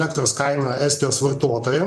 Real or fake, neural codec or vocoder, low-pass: fake; vocoder, 44.1 kHz, 128 mel bands, Pupu-Vocoder; 14.4 kHz